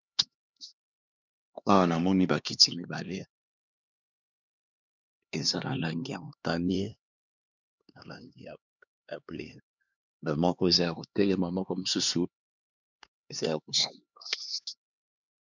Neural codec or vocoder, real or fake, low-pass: codec, 16 kHz, 2 kbps, X-Codec, HuBERT features, trained on LibriSpeech; fake; 7.2 kHz